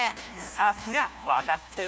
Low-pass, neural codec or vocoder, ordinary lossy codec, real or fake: none; codec, 16 kHz, 1 kbps, FunCodec, trained on LibriTTS, 50 frames a second; none; fake